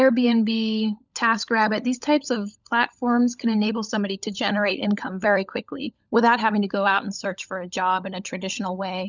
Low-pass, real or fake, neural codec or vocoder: 7.2 kHz; fake; codec, 16 kHz, 16 kbps, FunCodec, trained on LibriTTS, 50 frames a second